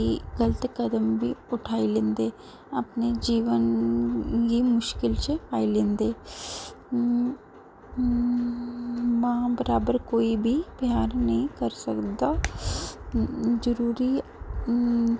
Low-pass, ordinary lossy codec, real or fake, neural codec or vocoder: none; none; real; none